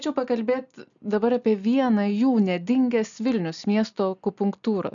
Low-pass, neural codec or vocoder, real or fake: 7.2 kHz; none; real